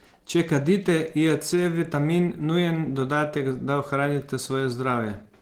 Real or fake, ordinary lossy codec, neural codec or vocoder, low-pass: real; Opus, 16 kbps; none; 19.8 kHz